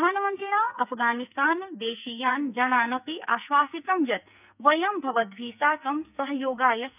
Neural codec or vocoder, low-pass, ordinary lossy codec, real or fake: codec, 44.1 kHz, 2.6 kbps, SNAC; 3.6 kHz; none; fake